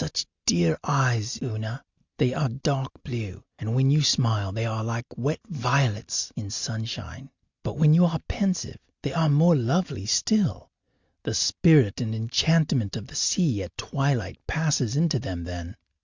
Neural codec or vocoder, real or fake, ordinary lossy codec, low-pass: none; real; Opus, 64 kbps; 7.2 kHz